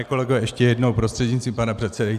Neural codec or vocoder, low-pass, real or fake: none; 14.4 kHz; real